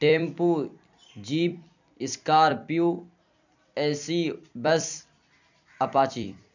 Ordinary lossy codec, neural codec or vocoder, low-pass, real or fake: none; vocoder, 44.1 kHz, 128 mel bands every 256 samples, BigVGAN v2; 7.2 kHz; fake